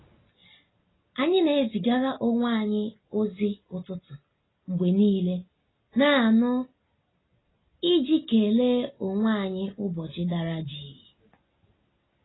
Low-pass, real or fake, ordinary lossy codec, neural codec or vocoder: 7.2 kHz; real; AAC, 16 kbps; none